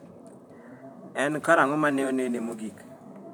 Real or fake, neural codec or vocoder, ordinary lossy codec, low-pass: fake; vocoder, 44.1 kHz, 128 mel bands, Pupu-Vocoder; none; none